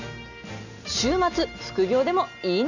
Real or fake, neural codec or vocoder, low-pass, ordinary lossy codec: real; none; 7.2 kHz; none